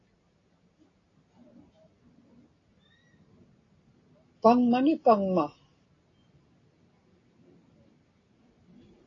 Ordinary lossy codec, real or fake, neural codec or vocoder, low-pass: AAC, 32 kbps; real; none; 7.2 kHz